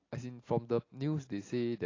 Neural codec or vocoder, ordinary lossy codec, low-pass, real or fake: none; AAC, 32 kbps; 7.2 kHz; real